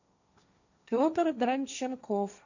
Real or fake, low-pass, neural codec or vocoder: fake; 7.2 kHz; codec, 16 kHz, 1.1 kbps, Voila-Tokenizer